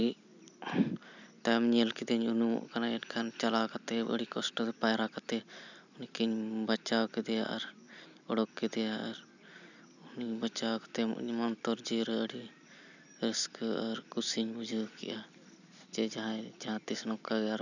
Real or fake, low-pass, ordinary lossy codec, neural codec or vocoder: real; 7.2 kHz; none; none